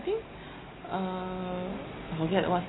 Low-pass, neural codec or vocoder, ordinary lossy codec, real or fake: 7.2 kHz; none; AAC, 16 kbps; real